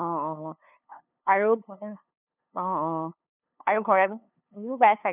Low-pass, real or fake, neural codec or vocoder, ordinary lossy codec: 3.6 kHz; fake; codec, 16 kHz, 2 kbps, FunCodec, trained on LibriTTS, 25 frames a second; none